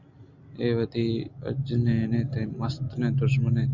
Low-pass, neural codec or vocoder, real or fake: 7.2 kHz; none; real